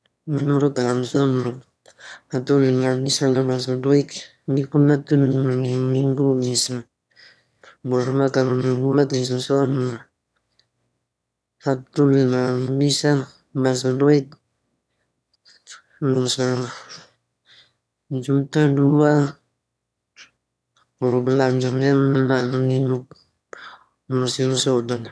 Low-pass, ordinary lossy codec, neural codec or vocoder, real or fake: none; none; autoencoder, 22.05 kHz, a latent of 192 numbers a frame, VITS, trained on one speaker; fake